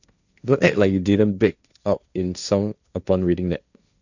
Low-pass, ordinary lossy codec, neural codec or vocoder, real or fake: 7.2 kHz; none; codec, 16 kHz, 1.1 kbps, Voila-Tokenizer; fake